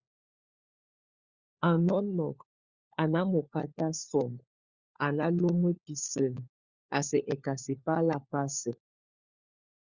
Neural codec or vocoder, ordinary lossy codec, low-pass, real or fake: codec, 16 kHz, 4 kbps, FunCodec, trained on LibriTTS, 50 frames a second; Opus, 64 kbps; 7.2 kHz; fake